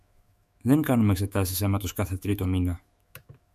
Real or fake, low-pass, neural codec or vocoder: fake; 14.4 kHz; autoencoder, 48 kHz, 128 numbers a frame, DAC-VAE, trained on Japanese speech